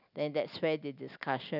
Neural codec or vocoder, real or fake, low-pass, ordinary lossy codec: none; real; 5.4 kHz; none